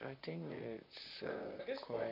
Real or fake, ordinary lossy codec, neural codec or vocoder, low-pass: fake; MP3, 48 kbps; vocoder, 44.1 kHz, 128 mel bands, Pupu-Vocoder; 5.4 kHz